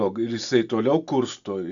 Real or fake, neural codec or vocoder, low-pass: real; none; 7.2 kHz